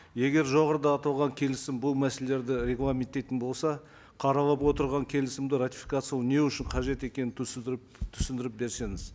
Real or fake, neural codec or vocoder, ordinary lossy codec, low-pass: real; none; none; none